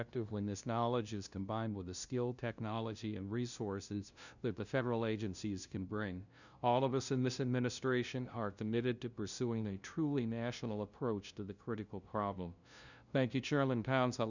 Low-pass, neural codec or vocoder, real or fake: 7.2 kHz; codec, 16 kHz, 0.5 kbps, FunCodec, trained on LibriTTS, 25 frames a second; fake